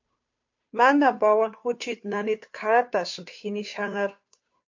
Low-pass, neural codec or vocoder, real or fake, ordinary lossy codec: 7.2 kHz; codec, 16 kHz, 2 kbps, FunCodec, trained on Chinese and English, 25 frames a second; fake; MP3, 48 kbps